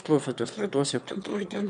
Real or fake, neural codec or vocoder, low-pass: fake; autoencoder, 22.05 kHz, a latent of 192 numbers a frame, VITS, trained on one speaker; 9.9 kHz